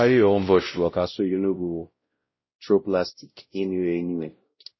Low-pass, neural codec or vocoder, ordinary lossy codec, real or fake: 7.2 kHz; codec, 16 kHz, 0.5 kbps, X-Codec, WavLM features, trained on Multilingual LibriSpeech; MP3, 24 kbps; fake